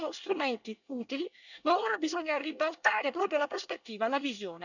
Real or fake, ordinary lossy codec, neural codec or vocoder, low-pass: fake; none; codec, 24 kHz, 1 kbps, SNAC; 7.2 kHz